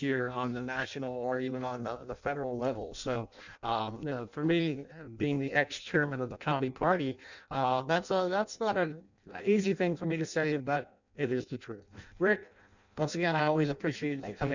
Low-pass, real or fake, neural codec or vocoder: 7.2 kHz; fake; codec, 16 kHz in and 24 kHz out, 0.6 kbps, FireRedTTS-2 codec